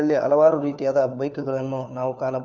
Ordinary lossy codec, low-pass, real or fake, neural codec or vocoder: none; 7.2 kHz; fake; codec, 16 kHz, 4 kbps, FunCodec, trained on LibriTTS, 50 frames a second